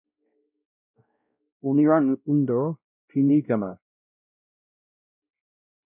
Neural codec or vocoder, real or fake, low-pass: codec, 16 kHz, 0.5 kbps, X-Codec, WavLM features, trained on Multilingual LibriSpeech; fake; 3.6 kHz